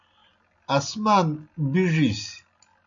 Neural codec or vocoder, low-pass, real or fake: none; 7.2 kHz; real